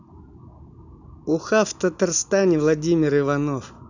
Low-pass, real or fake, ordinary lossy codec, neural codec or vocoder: 7.2 kHz; real; none; none